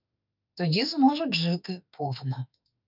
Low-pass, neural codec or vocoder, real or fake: 5.4 kHz; autoencoder, 48 kHz, 32 numbers a frame, DAC-VAE, trained on Japanese speech; fake